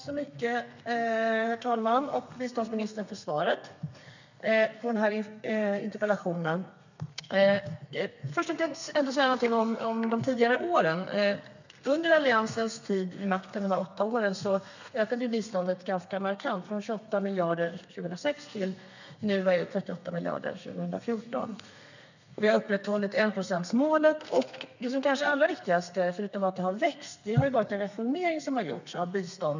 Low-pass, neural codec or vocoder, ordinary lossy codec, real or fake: 7.2 kHz; codec, 32 kHz, 1.9 kbps, SNAC; none; fake